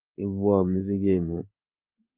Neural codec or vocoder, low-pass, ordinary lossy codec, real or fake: codec, 16 kHz in and 24 kHz out, 1 kbps, XY-Tokenizer; 3.6 kHz; Opus, 24 kbps; fake